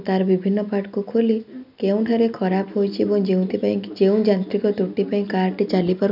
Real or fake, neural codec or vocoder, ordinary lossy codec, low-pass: real; none; none; 5.4 kHz